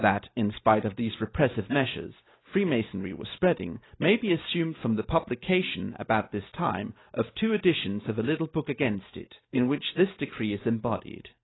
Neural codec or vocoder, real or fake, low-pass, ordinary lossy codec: none; real; 7.2 kHz; AAC, 16 kbps